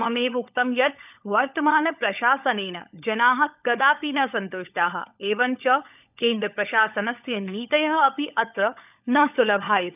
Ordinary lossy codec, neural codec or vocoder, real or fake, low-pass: none; codec, 16 kHz, 16 kbps, FunCodec, trained on LibriTTS, 50 frames a second; fake; 3.6 kHz